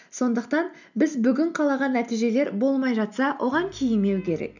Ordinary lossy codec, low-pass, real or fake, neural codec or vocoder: none; 7.2 kHz; real; none